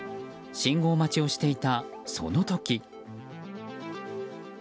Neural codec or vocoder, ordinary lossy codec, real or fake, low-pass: none; none; real; none